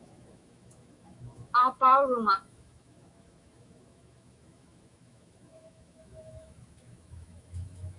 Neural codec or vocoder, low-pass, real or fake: autoencoder, 48 kHz, 128 numbers a frame, DAC-VAE, trained on Japanese speech; 10.8 kHz; fake